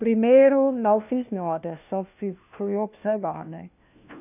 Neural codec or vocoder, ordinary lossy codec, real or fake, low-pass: codec, 16 kHz, 1 kbps, FunCodec, trained on LibriTTS, 50 frames a second; none; fake; 3.6 kHz